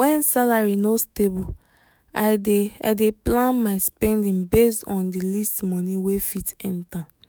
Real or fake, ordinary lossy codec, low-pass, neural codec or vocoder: fake; none; none; autoencoder, 48 kHz, 128 numbers a frame, DAC-VAE, trained on Japanese speech